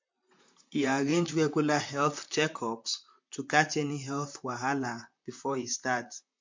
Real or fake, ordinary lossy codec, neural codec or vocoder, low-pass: fake; MP3, 48 kbps; vocoder, 44.1 kHz, 128 mel bands, Pupu-Vocoder; 7.2 kHz